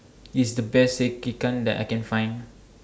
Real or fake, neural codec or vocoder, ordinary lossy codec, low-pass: real; none; none; none